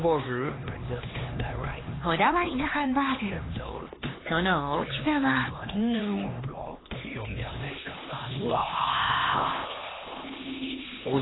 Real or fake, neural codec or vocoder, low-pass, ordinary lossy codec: fake; codec, 16 kHz, 2 kbps, X-Codec, HuBERT features, trained on LibriSpeech; 7.2 kHz; AAC, 16 kbps